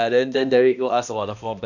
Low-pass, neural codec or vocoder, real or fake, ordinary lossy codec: 7.2 kHz; codec, 16 kHz, 1 kbps, X-Codec, HuBERT features, trained on balanced general audio; fake; none